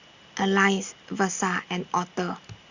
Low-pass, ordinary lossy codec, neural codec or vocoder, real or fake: 7.2 kHz; Opus, 64 kbps; none; real